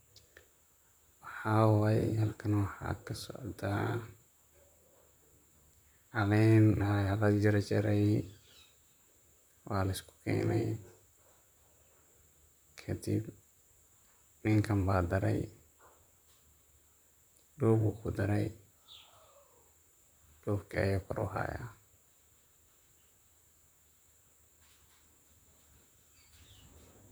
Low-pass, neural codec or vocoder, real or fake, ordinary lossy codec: none; vocoder, 44.1 kHz, 128 mel bands, Pupu-Vocoder; fake; none